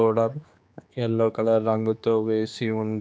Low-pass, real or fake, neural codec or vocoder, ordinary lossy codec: none; fake; codec, 16 kHz, 2 kbps, X-Codec, HuBERT features, trained on general audio; none